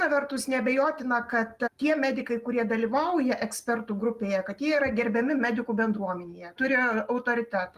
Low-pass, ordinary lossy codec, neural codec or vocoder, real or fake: 14.4 kHz; Opus, 32 kbps; none; real